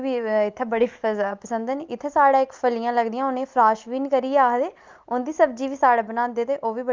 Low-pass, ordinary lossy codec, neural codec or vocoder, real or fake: 7.2 kHz; Opus, 32 kbps; none; real